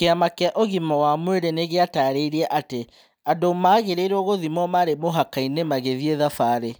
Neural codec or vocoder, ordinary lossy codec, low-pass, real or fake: none; none; none; real